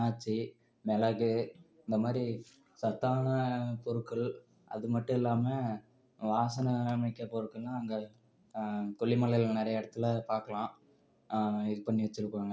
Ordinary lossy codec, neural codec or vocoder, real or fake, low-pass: none; none; real; none